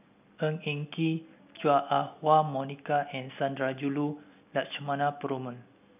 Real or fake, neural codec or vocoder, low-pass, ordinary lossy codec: real; none; 3.6 kHz; none